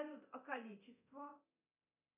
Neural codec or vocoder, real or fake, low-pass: codec, 24 kHz, 0.9 kbps, DualCodec; fake; 3.6 kHz